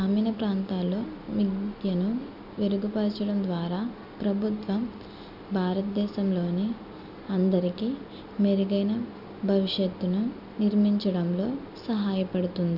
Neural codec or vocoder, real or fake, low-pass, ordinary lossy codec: none; real; 5.4 kHz; none